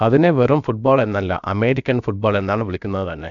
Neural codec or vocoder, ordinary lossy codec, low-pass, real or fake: codec, 16 kHz, about 1 kbps, DyCAST, with the encoder's durations; none; 7.2 kHz; fake